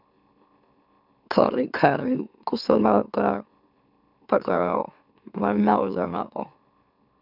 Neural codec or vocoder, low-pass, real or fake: autoencoder, 44.1 kHz, a latent of 192 numbers a frame, MeloTTS; 5.4 kHz; fake